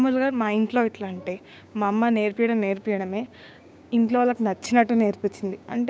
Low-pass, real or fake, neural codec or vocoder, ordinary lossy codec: none; fake; codec, 16 kHz, 6 kbps, DAC; none